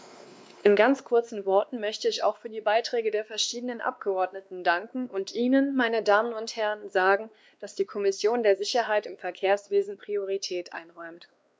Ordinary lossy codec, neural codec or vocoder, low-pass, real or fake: none; codec, 16 kHz, 2 kbps, X-Codec, WavLM features, trained on Multilingual LibriSpeech; none; fake